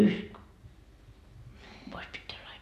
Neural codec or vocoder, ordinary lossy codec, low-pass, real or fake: none; none; 14.4 kHz; real